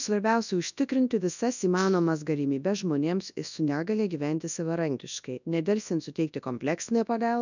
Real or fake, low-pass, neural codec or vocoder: fake; 7.2 kHz; codec, 24 kHz, 0.9 kbps, WavTokenizer, large speech release